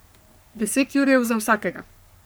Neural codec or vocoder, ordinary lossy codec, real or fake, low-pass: codec, 44.1 kHz, 3.4 kbps, Pupu-Codec; none; fake; none